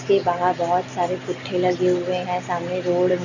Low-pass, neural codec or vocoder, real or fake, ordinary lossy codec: 7.2 kHz; none; real; none